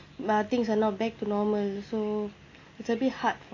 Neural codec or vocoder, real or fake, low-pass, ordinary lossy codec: none; real; 7.2 kHz; AAC, 32 kbps